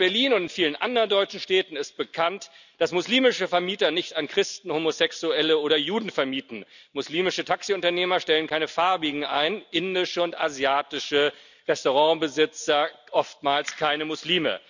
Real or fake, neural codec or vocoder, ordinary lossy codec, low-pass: real; none; none; 7.2 kHz